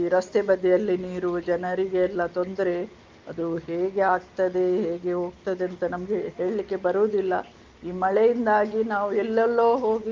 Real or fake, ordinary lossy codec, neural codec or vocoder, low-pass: real; Opus, 32 kbps; none; 7.2 kHz